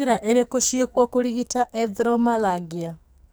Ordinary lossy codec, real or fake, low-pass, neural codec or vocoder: none; fake; none; codec, 44.1 kHz, 2.6 kbps, SNAC